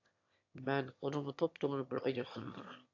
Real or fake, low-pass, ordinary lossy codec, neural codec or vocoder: fake; 7.2 kHz; AAC, 48 kbps; autoencoder, 22.05 kHz, a latent of 192 numbers a frame, VITS, trained on one speaker